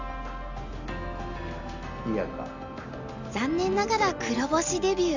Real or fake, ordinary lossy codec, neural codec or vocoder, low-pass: real; none; none; 7.2 kHz